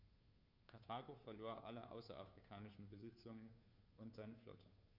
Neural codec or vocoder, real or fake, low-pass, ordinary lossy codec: codec, 16 kHz, 2 kbps, FunCodec, trained on Chinese and English, 25 frames a second; fake; 5.4 kHz; none